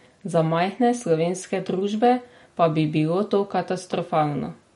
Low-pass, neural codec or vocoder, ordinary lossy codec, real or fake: 14.4 kHz; none; MP3, 48 kbps; real